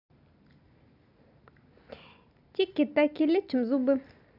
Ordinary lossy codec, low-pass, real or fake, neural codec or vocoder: none; 5.4 kHz; real; none